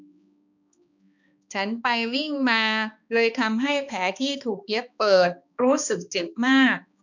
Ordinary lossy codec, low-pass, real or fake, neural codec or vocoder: none; 7.2 kHz; fake; codec, 16 kHz, 2 kbps, X-Codec, HuBERT features, trained on balanced general audio